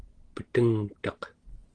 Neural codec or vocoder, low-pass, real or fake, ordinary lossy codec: none; 9.9 kHz; real; Opus, 16 kbps